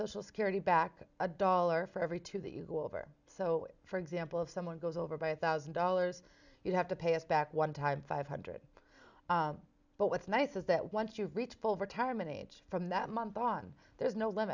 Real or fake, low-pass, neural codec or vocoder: real; 7.2 kHz; none